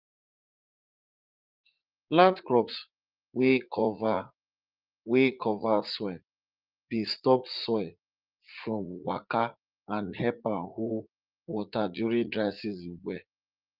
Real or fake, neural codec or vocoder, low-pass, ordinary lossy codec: fake; vocoder, 44.1 kHz, 80 mel bands, Vocos; 5.4 kHz; Opus, 32 kbps